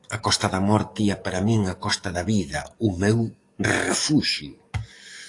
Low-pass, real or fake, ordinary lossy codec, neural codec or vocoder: 10.8 kHz; fake; AAC, 48 kbps; codec, 44.1 kHz, 7.8 kbps, DAC